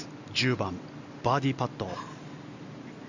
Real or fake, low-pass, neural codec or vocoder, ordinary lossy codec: real; 7.2 kHz; none; none